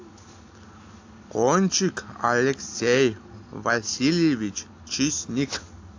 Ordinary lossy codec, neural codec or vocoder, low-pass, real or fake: AAC, 48 kbps; none; 7.2 kHz; real